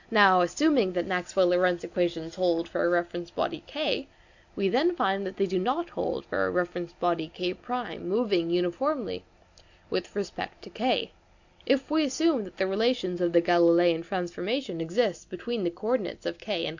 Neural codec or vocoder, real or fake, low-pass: none; real; 7.2 kHz